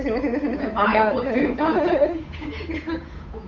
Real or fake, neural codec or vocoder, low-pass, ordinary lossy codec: fake; codec, 16 kHz, 8 kbps, FunCodec, trained on Chinese and English, 25 frames a second; 7.2 kHz; none